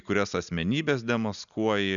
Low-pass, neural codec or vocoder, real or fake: 7.2 kHz; none; real